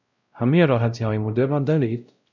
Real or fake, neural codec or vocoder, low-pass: fake; codec, 16 kHz, 0.5 kbps, X-Codec, WavLM features, trained on Multilingual LibriSpeech; 7.2 kHz